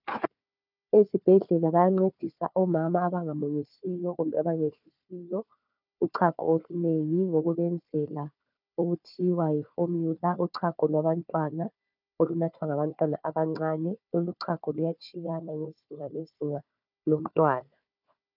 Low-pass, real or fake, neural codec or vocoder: 5.4 kHz; fake; codec, 16 kHz, 4 kbps, FunCodec, trained on Chinese and English, 50 frames a second